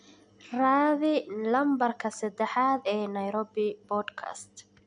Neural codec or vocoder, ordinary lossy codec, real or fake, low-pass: none; none; real; 9.9 kHz